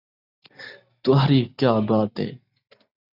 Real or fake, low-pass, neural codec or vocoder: real; 5.4 kHz; none